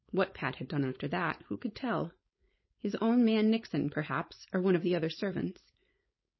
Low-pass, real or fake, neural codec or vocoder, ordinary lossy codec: 7.2 kHz; fake; codec, 16 kHz, 4.8 kbps, FACodec; MP3, 24 kbps